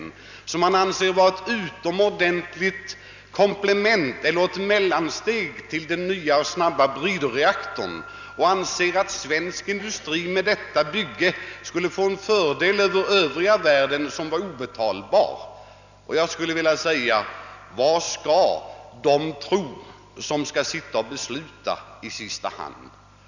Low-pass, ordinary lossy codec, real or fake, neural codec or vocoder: 7.2 kHz; none; real; none